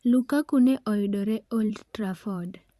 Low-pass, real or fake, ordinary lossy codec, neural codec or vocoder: 14.4 kHz; fake; Opus, 64 kbps; vocoder, 44.1 kHz, 128 mel bands every 512 samples, BigVGAN v2